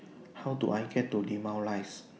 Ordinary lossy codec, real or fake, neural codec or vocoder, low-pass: none; real; none; none